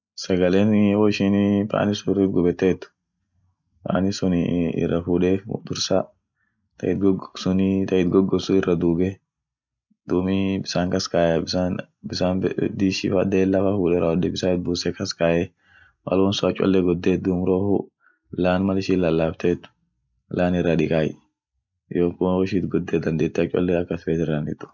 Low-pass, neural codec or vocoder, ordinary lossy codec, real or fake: 7.2 kHz; none; none; real